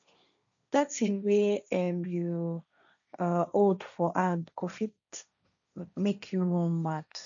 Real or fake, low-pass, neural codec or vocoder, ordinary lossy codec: fake; 7.2 kHz; codec, 16 kHz, 1.1 kbps, Voila-Tokenizer; none